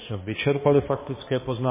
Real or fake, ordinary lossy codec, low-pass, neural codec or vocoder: fake; MP3, 16 kbps; 3.6 kHz; codec, 16 kHz, 2 kbps, X-Codec, HuBERT features, trained on balanced general audio